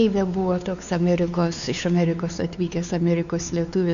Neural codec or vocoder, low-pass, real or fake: codec, 16 kHz, 4 kbps, X-Codec, WavLM features, trained on Multilingual LibriSpeech; 7.2 kHz; fake